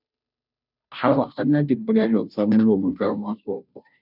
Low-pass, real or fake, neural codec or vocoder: 5.4 kHz; fake; codec, 16 kHz, 0.5 kbps, FunCodec, trained on Chinese and English, 25 frames a second